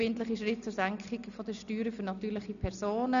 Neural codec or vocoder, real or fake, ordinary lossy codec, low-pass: none; real; none; 7.2 kHz